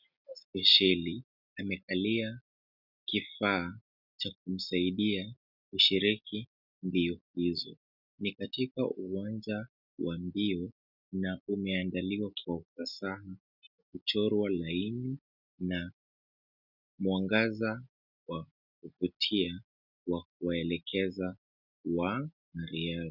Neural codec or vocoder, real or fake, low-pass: none; real; 5.4 kHz